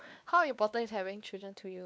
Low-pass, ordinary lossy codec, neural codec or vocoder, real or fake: none; none; codec, 16 kHz, 2 kbps, X-Codec, WavLM features, trained on Multilingual LibriSpeech; fake